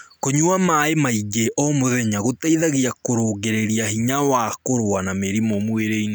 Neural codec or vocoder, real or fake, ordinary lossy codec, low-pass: none; real; none; none